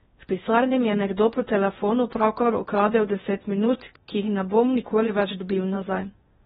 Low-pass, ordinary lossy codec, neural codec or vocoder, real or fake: 10.8 kHz; AAC, 16 kbps; codec, 16 kHz in and 24 kHz out, 0.6 kbps, FocalCodec, streaming, 2048 codes; fake